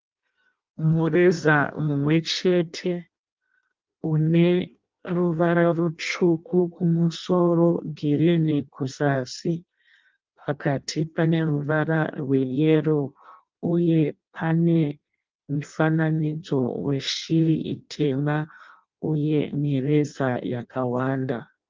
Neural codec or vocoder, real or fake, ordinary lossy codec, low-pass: codec, 16 kHz in and 24 kHz out, 0.6 kbps, FireRedTTS-2 codec; fake; Opus, 32 kbps; 7.2 kHz